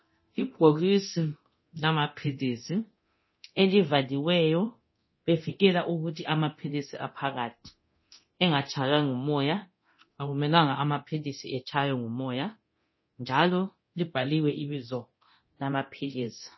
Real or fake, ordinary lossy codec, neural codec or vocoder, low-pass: fake; MP3, 24 kbps; codec, 24 kHz, 0.9 kbps, DualCodec; 7.2 kHz